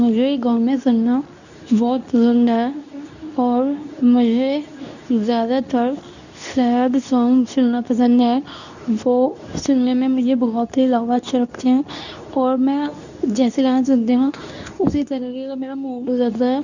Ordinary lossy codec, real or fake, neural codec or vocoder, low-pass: none; fake; codec, 24 kHz, 0.9 kbps, WavTokenizer, medium speech release version 2; 7.2 kHz